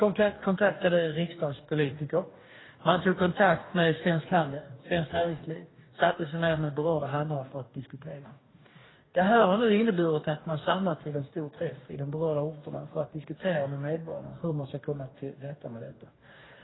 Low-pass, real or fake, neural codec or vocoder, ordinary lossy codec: 7.2 kHz; fake; codec, 44.1 kHz, 2.6 kbps, DAC; AAC, 16 kbps